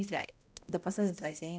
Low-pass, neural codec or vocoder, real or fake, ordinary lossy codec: none; codec, 16 kHz, 0.5 kbps, X-Codec, HuBERT features, trained on balanced general audio; fake; none